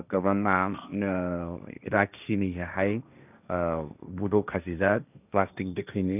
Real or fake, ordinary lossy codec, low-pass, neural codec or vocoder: fake; none; 3.6 kHz; codec, 16 kHz, 1.1 kbps, Voila-Tokenizer